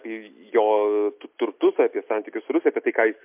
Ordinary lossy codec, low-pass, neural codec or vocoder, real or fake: MP3, 32 kbps; 3.6 kHz; none; real